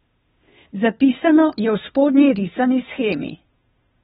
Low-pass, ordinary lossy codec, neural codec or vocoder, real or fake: 7.2 kHz; AAC, 16 kbps; codec, 16 kHz, 2 kbps, FunCodec, trained on Chinese and English, 25 frames a second; fake